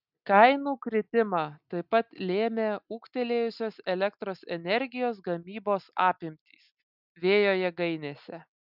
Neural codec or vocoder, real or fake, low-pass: none; real; 5.4 kHz